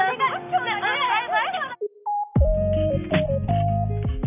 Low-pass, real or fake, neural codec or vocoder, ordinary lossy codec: 3.6 kHz; real; none; none